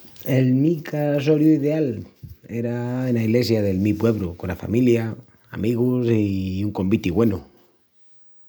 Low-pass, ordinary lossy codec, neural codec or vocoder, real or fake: none; none; none; real